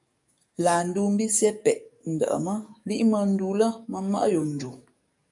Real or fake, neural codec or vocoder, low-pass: fake; codec, 44.1 kHz, 7.8 kbps, DAC; 10.8 kHz